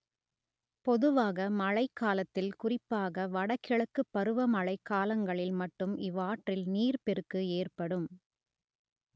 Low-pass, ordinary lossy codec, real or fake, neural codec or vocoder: none; none; real; none